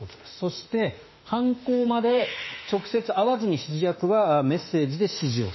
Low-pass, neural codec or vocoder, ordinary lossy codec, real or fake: 7.2 kHz; autoencoder, 48 kHz, 32 numbers a frame, DAC-VAE, trained on Japanese speech; MP3, 24 kbps; fake